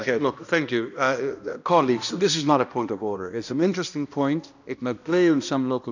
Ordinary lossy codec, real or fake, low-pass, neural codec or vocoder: none; fake; 7.2 kHz; codec, 16 kHz, 1 kbps, X-Codec, HuBERT features, trained on balanced general audio